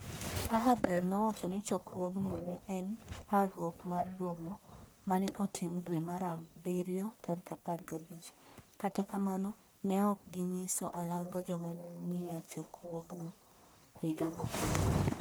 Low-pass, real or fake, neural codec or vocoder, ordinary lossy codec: none; fake; codec, 44.1 kHz, 1.7 kbps, Pupu-Codec; none